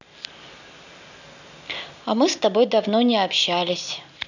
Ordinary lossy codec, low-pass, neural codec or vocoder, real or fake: none; 7.2 kHz; none; real